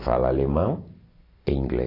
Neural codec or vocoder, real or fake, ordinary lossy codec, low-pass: none; real; AAC, 24 kbps; 5.4 kHz